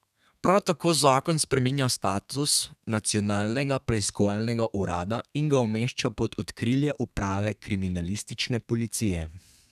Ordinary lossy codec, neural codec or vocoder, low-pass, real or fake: none; codec, 32 kHz, 1.9 kbps, SNAC; 14.4 kHz; fake